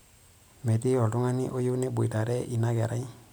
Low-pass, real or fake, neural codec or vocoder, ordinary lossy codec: none; real; none; none